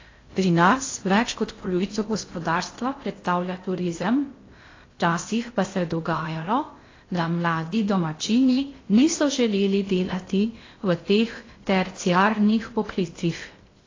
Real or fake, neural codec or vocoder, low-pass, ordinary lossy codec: fake; codec, 16 kHz in and 24 kHz out, 0.6 kbps, FocalCodec, streaming, 2048 codes; 7.2 kHz; AAC, 32 kbps